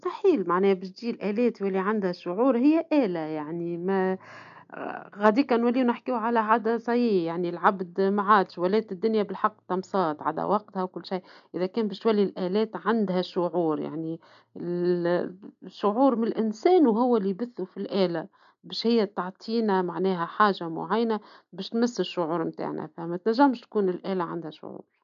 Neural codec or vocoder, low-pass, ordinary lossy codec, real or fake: none; 7.2 kHz; none; real